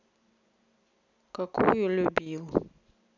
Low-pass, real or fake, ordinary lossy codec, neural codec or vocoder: 7.2 kHz; real; none; none